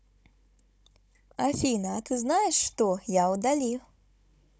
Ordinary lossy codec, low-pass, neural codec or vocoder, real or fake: none; none; codec, 16 kHz, 16 kbps, FunCodec, trained on Chinese and English, 50 frames a second; fake